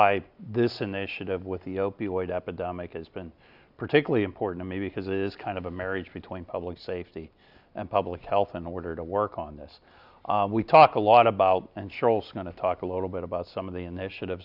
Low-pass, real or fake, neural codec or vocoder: 5.4 kHz; real; none